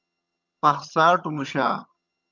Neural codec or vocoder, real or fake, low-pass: vocoder, 22.05 kHz, 80 mel bands, HiFi-GAN; fake; 7.2 kHz